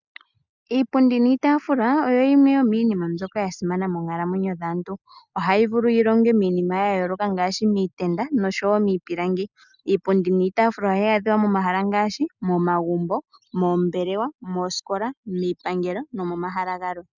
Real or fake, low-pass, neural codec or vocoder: real; 7.2 kHz; none